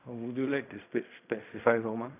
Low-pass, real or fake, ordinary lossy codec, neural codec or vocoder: 3.6 kHz; fake; none; codec, 16 kHz in and 24 kHz out, 0.4 kbps, LongCat-Audio-Codec, fine tuned four codebook decoder